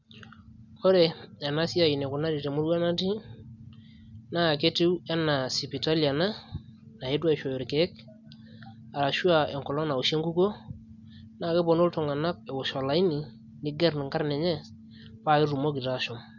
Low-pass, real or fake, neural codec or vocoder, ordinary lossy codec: 7.2 kHz; real; none; none